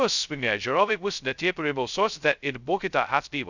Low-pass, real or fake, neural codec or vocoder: 7.2 kHz; fake; codec, 16 kHz, 0.2 kbps, FocalCodec